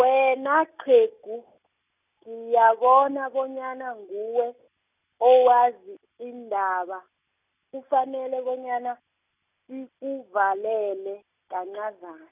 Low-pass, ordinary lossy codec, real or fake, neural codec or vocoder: 3.6 kHz; none; real; none